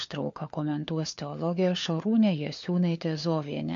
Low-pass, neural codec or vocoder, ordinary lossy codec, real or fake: 7.2 kHz; codec, 16 kHz, 4 kbps, FunCodec, trained on LibriTTS, 50 frames a second; MP3, 48 kbps; fake